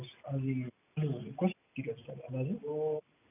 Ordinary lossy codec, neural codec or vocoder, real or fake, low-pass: none; none; real; 3.6 kHz